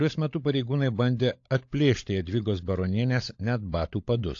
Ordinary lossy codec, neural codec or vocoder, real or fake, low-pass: AAC, 48 kbps; codec, 16 kHz, 16 kbps, FunCodec, trained on LibriTTS, 50 frames a second; fake; 7.2 kHz